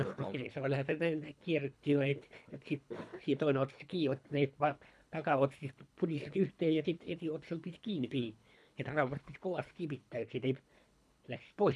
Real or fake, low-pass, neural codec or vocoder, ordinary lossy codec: fake; none; codec, 24 kHz, 3 kbps, HILCodec; none